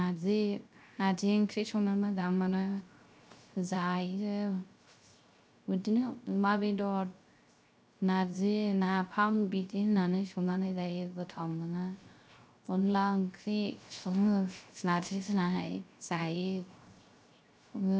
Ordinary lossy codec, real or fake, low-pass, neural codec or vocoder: none; fake; none; codec, 16 kHz, 0.3 kbps, FocalCodec